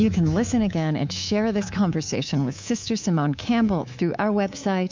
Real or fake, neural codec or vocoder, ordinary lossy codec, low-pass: fake; codec, 24 kHz, 3.1 kbps, DualCodec; MP3, 64 kbps; 7.2 kHz